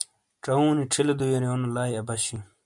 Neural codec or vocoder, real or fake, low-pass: none; real; 10.8 kHz